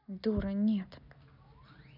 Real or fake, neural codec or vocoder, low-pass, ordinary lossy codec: real; none; 5.4 kHz; none